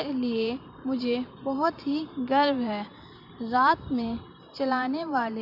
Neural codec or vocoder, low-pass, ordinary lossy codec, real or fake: vocoder, 44.1 kHz, 128 mel bands every 512 samples, BigVGAN v2; 5.4 kHz; none; fake